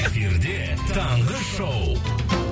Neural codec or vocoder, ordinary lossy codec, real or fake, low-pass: none; none; real; none